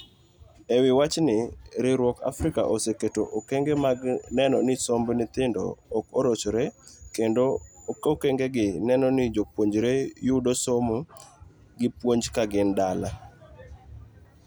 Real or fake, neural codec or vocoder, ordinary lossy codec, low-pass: real; none; none; none